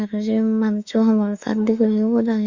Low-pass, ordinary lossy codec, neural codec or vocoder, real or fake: 7.2 kHz; Opus, 64 kbps; autoencoder, 48 kHz, 32 numbers a frame, DAC-VAE, trained on Japanese speech; fake